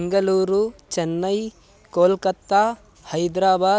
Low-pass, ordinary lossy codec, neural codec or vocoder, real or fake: none; none; none; real